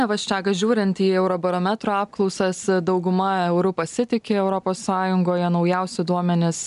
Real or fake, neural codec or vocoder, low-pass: real; none; 10.8 kHz